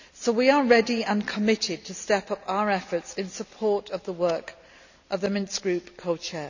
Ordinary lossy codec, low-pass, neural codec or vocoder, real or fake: none; 7.2 kHz; none; real